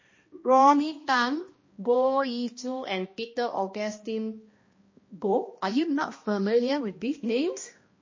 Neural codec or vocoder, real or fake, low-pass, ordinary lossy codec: codec, 16 kHz, 1 kbps, X-Codec, HuBERT features, trained on balanced general audio; fake; 7.2 kHz; MP3, 32 kbps